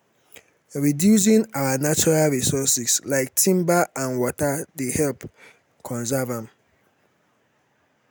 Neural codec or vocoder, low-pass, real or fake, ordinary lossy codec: none; none; real; none